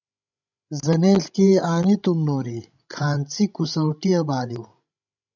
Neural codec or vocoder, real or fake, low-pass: codec, 16 kHz, 16 kbps, FreqCodec, larger model; fake; 7.2 kHz